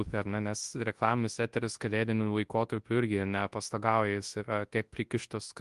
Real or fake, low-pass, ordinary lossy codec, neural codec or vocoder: fake; 10.8 kHz; Opus, 24 kbps; codec, 24 kHz, 0.9 kbps, WavTokenizer, large speech release